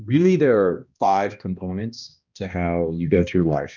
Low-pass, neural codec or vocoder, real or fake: 7.2 kHz; codec, 16 kHz, 1 kbps, X-Codec, HuBERT features, trained on balanced general audio; fake